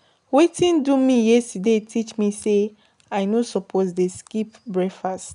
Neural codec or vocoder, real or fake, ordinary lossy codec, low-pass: none; real; none; 10.8 kHz